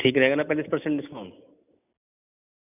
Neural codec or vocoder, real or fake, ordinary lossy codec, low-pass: none; real; none; 3.6 kHz